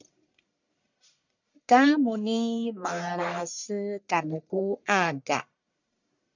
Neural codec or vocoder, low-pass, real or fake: codec, 44.1 kHz, 1.7 kbps, Pupu-Codec; 7.2 kHz; fake